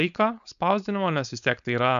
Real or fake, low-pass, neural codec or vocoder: fake; 7.2 kHz; codec, 16 kHz, 4.8 kbps, FACodec